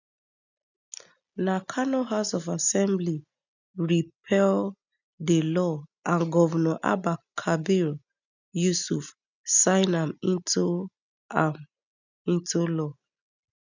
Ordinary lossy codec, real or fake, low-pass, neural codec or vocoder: none; real; 7.2 kHz; none